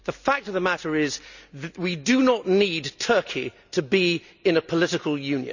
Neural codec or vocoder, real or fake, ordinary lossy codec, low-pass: none; real; none; 7.2 kHz